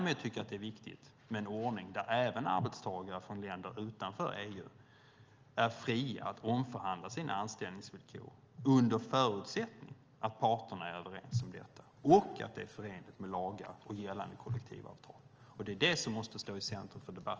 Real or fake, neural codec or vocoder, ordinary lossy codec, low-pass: real; none; Opus, 24 kbps; 7.2 kHz